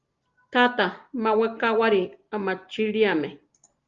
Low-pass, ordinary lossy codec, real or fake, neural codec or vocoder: 7.2 kHz; Opus, 32 kbps; real; none